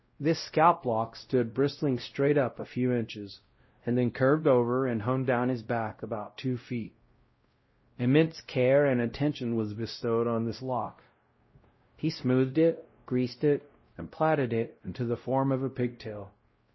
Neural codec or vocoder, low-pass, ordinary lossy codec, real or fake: codec, 16 kHz, 0.5 kbps, X-Codec, WavLM features, trained on Multilingual LibriSpeech; 7.2 kHz; MP3, 24 kbps; fake